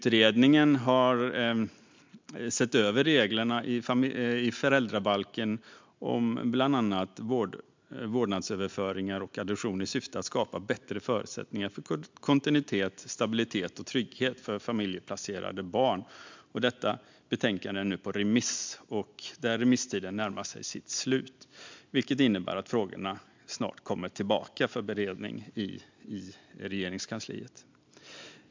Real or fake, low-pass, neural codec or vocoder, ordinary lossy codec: real; 7.2 kHz; none; MP3, 64 kbps